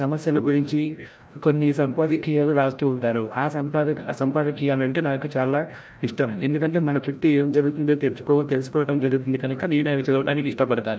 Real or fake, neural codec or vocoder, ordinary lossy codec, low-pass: fake; codec, 16 kHz, 0.5 kbps, FreqCodec, larger model; none; none